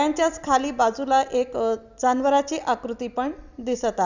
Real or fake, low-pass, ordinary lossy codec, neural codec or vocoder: real; 7.2 kHz; none; none